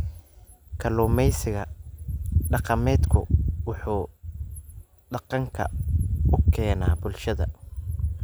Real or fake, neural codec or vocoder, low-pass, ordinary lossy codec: real; none; none; none